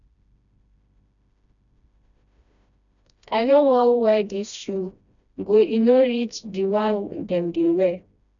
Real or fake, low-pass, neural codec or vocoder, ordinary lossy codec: fake; 7.2 kHz; codec, 16 kHz, 1 kbps, FreqCodec, smaller model; none